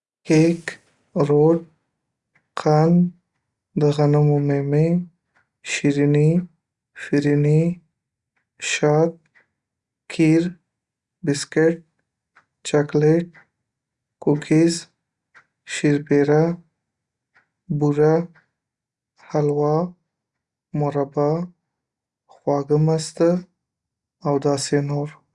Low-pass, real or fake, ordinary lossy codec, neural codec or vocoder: 10.8 kHz; real; Opus, 64 kbps; none